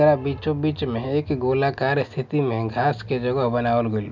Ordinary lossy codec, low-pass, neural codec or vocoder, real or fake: none; 7.2 kHz; none; real